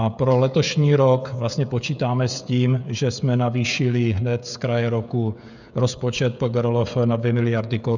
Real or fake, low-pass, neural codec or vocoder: fake; 7.2 kHz; codec, 16 kHz, 16 kbps, FreqCodec, smaller model